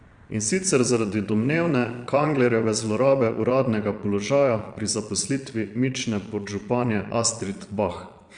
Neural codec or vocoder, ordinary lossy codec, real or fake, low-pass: vocoder, 22.05 kHz, 80 mel bands, Vocos; Opus, 64 kbps; fake; 9.9 kHz